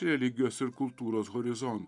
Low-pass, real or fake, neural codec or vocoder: 10.8 kHz; fake; vocoder, 44.1 kHz, 128 mel bands every 512 samples, BigVGAN v2